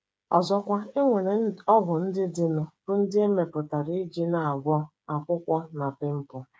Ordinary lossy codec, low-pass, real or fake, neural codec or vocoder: none; none; fake; codec, 16 kHz, 8 kbps, FreqCodec, smaller model